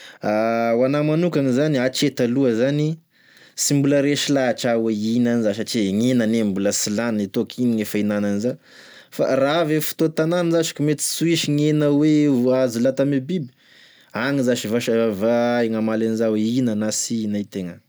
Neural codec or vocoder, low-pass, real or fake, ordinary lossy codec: none; none; real; none